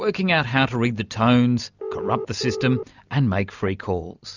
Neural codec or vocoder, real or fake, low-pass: none; real; 7.2 kHz